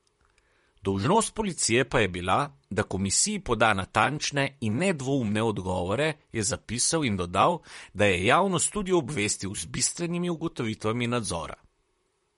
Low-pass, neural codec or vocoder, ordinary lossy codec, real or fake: 19.8 kHz; vocoder, 44.1 kHz, 128 mel bands, Pupu-Vocoder; MP3, 48 kbps; fake